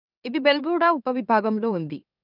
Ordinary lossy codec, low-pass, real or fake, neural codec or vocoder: none; 5.4 kHz; fake; autoencoder, 44.1 kHz, a latent of 192 numbers a frame, MeloTTS